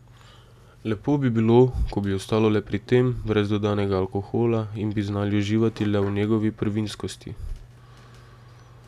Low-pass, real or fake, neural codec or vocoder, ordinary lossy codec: 14.4 kHz; real; none; none